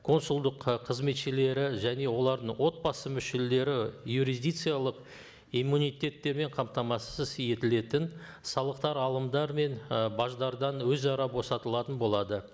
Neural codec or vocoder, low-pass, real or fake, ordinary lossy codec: none; none; real; none